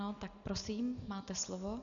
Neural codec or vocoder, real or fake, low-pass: none; real; 7.2 kHz